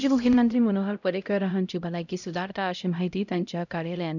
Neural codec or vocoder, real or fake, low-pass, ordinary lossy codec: codec, 16 kHz, 0.5 kbps, X-Codec, HuBERT features, trained on LibriSpeech; fake; 7.2 kHz; none